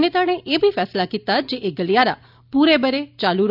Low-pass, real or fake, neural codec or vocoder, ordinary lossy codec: 5.4 kHz; real; none; none